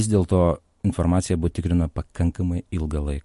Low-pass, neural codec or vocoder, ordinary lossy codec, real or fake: 14.4 kHz; none; MP3, 48 kbps; real